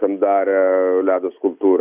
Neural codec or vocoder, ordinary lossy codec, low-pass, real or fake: none; Opus, 64 kbps; 3.6 kHz; real